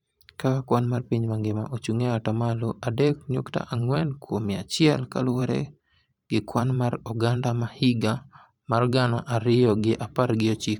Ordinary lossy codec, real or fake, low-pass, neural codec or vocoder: MP3, 96 kbps; fake; 19.8 kHz; vocoder, 44.1 kHz, 128 mel bands every 512 samples, BigVGAN v2